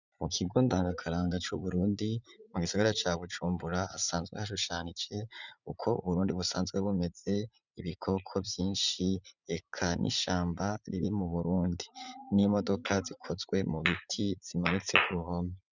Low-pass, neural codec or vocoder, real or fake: 7.2 kHz; vocoder, 44.1 kHz, 80 mel bands, Vocos; fake